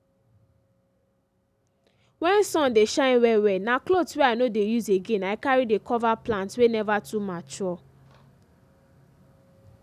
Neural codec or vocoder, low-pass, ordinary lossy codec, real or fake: none; 14.4 kHz; none; real